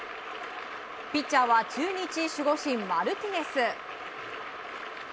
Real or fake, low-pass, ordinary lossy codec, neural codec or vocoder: real; none; none; none